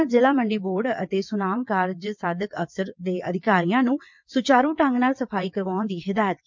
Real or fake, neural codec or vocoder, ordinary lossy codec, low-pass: fake; vocoder, 22.05 kHz, 80 mel bands, WaveNeXt; MP3, 64 kbps; 7.2 kHz